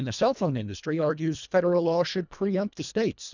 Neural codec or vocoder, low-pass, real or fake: codec, 24 kHz, 1.5 kbps, HILCodec; 7.2 kHz; fake